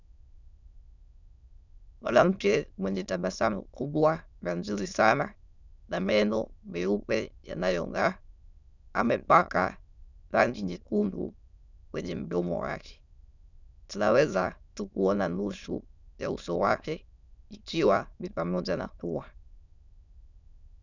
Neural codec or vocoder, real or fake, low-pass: autoencoder, 22.05 kHz, a latent of 192 numbers a frame, VITS, trained on many speakers; fake; 7.2 kHz